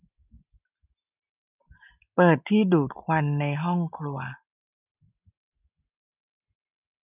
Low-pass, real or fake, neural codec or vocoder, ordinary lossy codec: 3.6 kHz; real; none; none